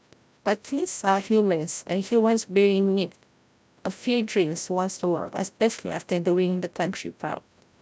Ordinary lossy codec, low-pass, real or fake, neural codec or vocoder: none; none; fake; codec, 16 kHz, 0.5 kbps, FreqCodec, larger model